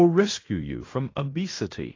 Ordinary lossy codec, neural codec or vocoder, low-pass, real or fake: AAC, 32 kbps; codec, 16 kHz in and 24 kHz out, 0.9 kbps, LongCat-Audio-Codec, fine tuned four codebook decoder; 7.2 kHz; fake